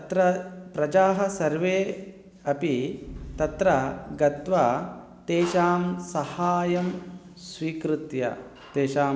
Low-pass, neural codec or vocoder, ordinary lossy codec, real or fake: none; none; none; real